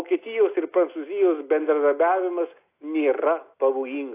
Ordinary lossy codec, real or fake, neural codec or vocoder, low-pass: AAC, 24 kbps; real; none; 3.6 kHz